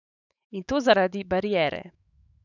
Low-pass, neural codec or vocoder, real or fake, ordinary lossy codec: 7.2 kHz; autoencoder, 48 kHz, 128 numbers a frame, DAC-VAE, trained on Japanese speech; fake; none